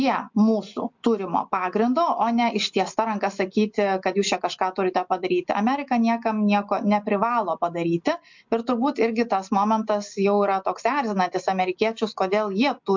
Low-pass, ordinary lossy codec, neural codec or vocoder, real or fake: 7.2 kHz; MP3, 64 kbps; none; real